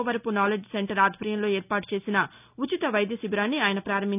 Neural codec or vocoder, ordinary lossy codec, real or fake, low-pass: none; none; real; 3.6 kHz